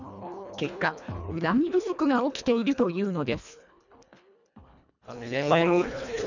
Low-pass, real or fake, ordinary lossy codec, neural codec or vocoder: 7.2 kHz; fake; none; codec, 24 kHz, 1.5 kbps, HILCodec